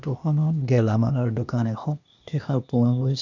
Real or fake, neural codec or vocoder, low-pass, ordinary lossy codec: fake; codec, 16 kHz, 0.8 kbps, ZipCodec; 7.2 kHz; none